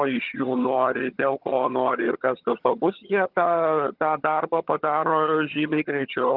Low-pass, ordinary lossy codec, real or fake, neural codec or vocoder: 5.4 kHz; Opus, 32 kbps; fake; vocoder, 22.05 kHz, 80 mel bands, HiFi-GAN